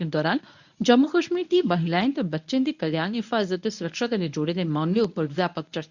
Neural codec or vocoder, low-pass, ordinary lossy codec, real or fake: codec, 24 kHz, 0.9 kbps, WavTokenizer, medium speech release version 1; 7.2 kHz; none; fake